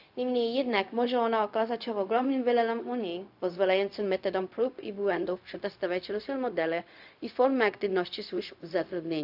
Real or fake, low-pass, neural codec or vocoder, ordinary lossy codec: fake; 5.4 kHz; codec, 16 kHz, 0.4 kbps, LongCat-Audio-Codec; AAC, 48 kbps